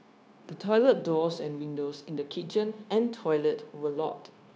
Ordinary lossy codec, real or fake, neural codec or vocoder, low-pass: none; fake; codec, 16 kHz, 0.9 kbps, LongCat-Audio-Codec; none